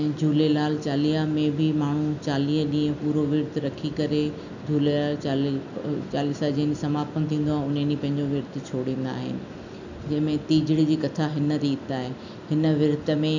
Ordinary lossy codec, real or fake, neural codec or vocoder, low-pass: none; real; none; 7.2 kHz